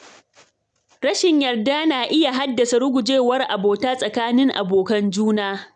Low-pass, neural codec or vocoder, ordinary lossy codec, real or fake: 9.9 kHz; none; none; real